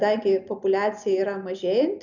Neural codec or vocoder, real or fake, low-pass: none; real; 7.2 kHz